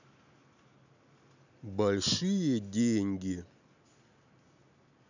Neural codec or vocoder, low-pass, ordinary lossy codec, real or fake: none; 7.2 kHz; none; real